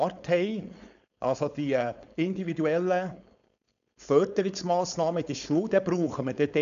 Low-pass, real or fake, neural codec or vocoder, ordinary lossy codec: 7.2 kHz; fake; codec, 16 kHz, 4.8 kbps, FACodec; none